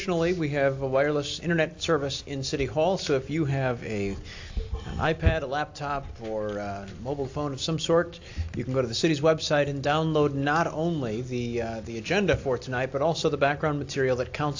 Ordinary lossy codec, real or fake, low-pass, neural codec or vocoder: AAC, 48 kbps; real; 7.2 kHz; none